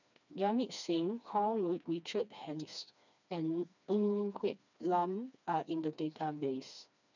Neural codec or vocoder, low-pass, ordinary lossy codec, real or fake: codec, 16 kHz, 2 kbps, FreqCodec, smaller model; 7.2 kHz; none; fake